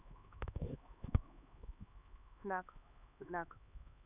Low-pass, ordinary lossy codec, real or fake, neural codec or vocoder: 3.6 kHz; none; fake; codec, 16 kHz, 4 kbps, X-Codec, HuBERT features, trained on LibriSpeech